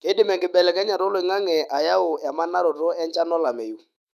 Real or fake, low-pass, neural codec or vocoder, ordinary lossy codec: fake; 14.4 kHz; autoencoder, 48 kHz, 128 numbers a frame, DAC-VAE, trained on Japanese speech; none